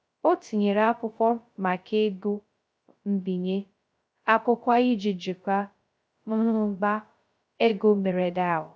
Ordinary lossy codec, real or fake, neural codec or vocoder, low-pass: none; fake; codec, 16 kHz, 0.2 kbps, FocalCodec; none